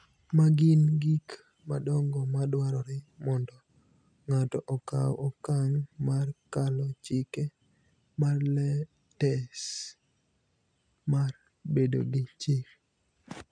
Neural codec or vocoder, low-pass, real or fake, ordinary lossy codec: none; 9.9 kHz; real; none